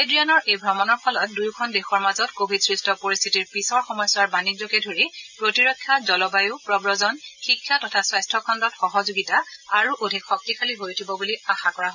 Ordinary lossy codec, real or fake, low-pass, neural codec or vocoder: none; real; 7.2 kHz; none